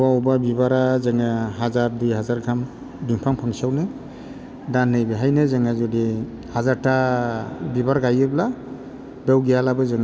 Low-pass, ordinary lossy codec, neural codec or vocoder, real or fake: none; none; none; real